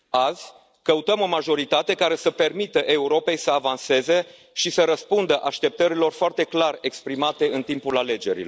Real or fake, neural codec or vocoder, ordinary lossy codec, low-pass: real; none; none; none